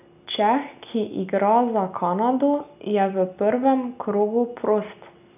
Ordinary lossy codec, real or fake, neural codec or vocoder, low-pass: none; real; none; 3.6 kHz